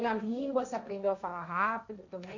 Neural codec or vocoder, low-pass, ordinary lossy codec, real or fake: codec, 16 kHz, 1.1 kbps, Voila-Tokenizer; 7.2 kHz; none; fake